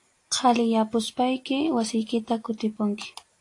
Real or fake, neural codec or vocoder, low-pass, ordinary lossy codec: real; none; 10.8 kHz; AAC, 48 kbps